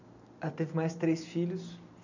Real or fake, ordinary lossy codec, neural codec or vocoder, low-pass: real; none; none; 7.2 kHz